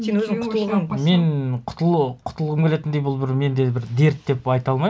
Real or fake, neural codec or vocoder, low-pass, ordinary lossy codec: real; none; none; none